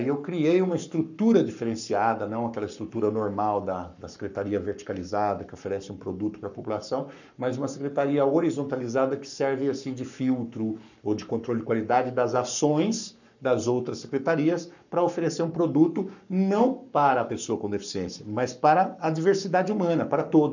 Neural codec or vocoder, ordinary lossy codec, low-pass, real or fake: codec, 44.1 kHz, 7.8 kbps, Pupu-Codec; none; 7.2 kHz; fake